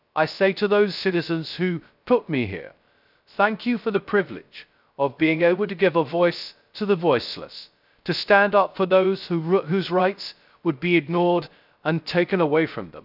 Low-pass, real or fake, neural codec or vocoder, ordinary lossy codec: 5.4 kHz; fake; codec, 16 kHz, 0.3 kbps, FocalCodec; none